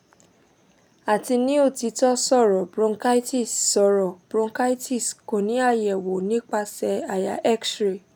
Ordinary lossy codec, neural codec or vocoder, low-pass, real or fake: none; none; none; real